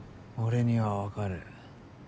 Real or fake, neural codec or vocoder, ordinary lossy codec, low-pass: real; none; none; none